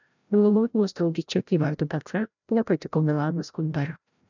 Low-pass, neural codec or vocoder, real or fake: 7.2 kHz; codec, 16 kHz, 0.5 kbps, FreqCodec, larger model; fake